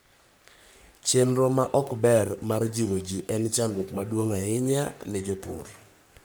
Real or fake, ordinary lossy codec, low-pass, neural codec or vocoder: fake; none; none; codec, 44.1 kHz, 3.4 kbps, Pupu-Codec